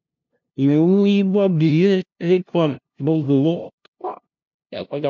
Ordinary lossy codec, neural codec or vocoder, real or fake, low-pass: MP3, 64 kbps; codec, 16 kHz, 0.5 kbps, FunCodec, trained on LibriTTS, 25 frames a second; fake; 7.2 kHz